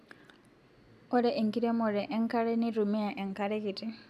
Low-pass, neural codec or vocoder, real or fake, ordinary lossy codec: 14.4 kHz; none; real; none